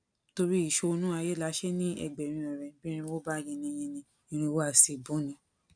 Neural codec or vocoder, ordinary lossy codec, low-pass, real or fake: none; none; 9.9 kHz; real